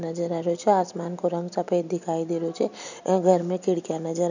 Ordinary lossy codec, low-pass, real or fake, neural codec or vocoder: none; 7.2 kHz; real; none